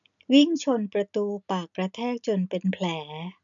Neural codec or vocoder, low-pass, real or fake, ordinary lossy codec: none; 7.2 kHz; real; none